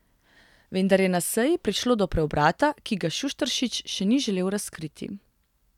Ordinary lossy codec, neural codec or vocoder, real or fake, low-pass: none; none; real; 19.8 kHz